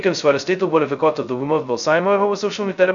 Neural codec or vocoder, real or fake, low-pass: codec, 16 kHz, 0.2 kbps, FocalCodec; fake; 7.2 kHz